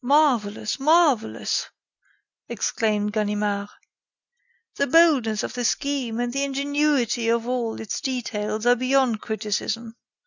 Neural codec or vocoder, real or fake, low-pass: none; real; 7.2 kHz